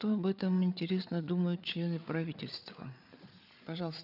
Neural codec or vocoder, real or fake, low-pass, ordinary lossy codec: codec, 16 kHz, 8 kbps, FreqCodec, larger model; fake; 5.4 kHz; none